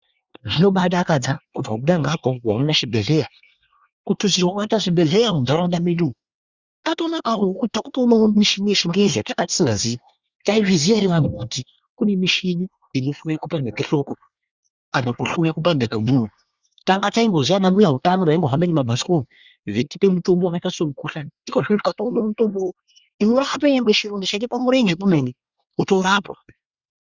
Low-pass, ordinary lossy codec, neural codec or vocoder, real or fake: 7.2 kHz; Opus, 64 kbps; codec, 24 kHz, 1 kbps, SNAC; fake